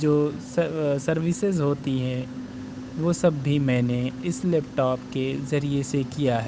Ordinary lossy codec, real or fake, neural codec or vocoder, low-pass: none; fake; codec, 16 kHz, 8 kbps, FunCodec, trained on Chinese and English, 25 frames a second; none